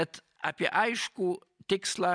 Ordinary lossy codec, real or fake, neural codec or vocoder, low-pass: MP3, 96 kbps; real; none; 9.9 kHz